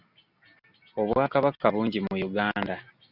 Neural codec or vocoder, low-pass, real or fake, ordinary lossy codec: none; 5.4 kHz; real; Opus, 64 kbps